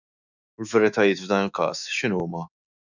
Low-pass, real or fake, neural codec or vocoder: 7.2 kHz; fake; autoencoder, 48 kHz, 128 numbers a frame, DAC-VAE, trained on Japanese speech